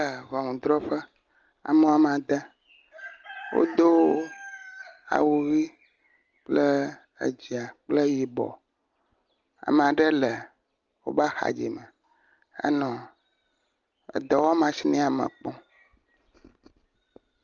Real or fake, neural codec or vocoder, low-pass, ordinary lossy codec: real; none; 7.2 kHz; Opus, 24 kbps